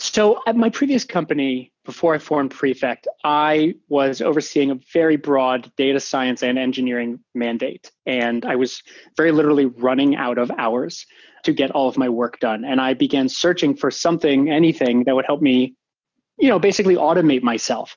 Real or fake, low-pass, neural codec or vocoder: real; 7.2 kHz; none